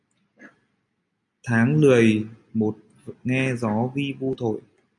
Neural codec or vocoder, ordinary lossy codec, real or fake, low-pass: none; MP3, 64 kbps; real; 10.8 kHz